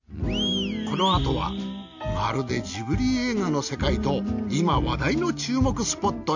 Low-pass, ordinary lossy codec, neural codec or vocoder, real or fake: 7.2 kHz; none; none; real